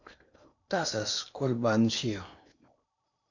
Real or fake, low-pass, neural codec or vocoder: fake; 7.2 kHz; codec, 16 kHz in and 24 kHz out, 0.8 kbps, FocalCodec, streaming, 65536 codes